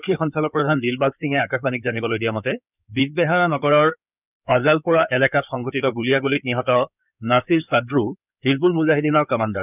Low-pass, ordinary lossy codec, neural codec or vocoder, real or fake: 3.6 kHz; none; codec, 16 kHz in and 24 kHz out, 2.2 kbps, FireRedTTS-2 codec; fake